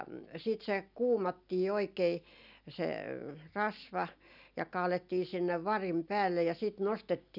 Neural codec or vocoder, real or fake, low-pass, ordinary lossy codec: none; real; 5.4 kHz; none